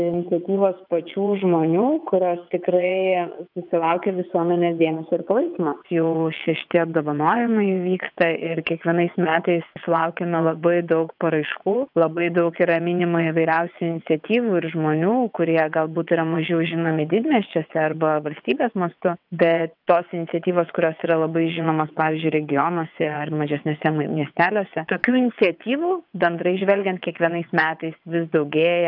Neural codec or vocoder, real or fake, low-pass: vocoder, 22.05 kHz, 80 mel bands, WaveNeXt; fake; 5.4 kHz